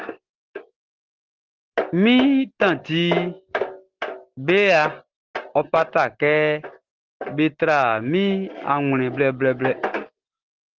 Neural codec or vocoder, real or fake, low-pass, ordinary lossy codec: none; real; 7.2 kHz; Opus, 16 kbps